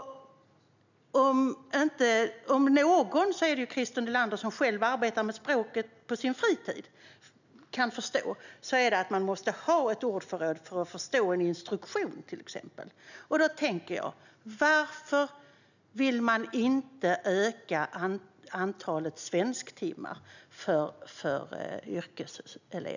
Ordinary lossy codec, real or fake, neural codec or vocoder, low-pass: none; real; none; 7.2 kHz